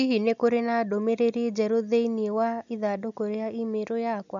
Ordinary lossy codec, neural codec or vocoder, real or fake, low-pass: none; none; real; 7.2 kHz